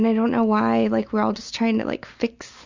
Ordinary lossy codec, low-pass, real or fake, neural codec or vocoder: Opus, 64 kbps; 7.2 kHz; real; none